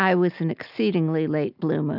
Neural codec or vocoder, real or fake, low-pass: none; real; 5.4 kHz